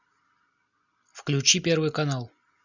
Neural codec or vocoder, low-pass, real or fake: none; 7.2 kHz; real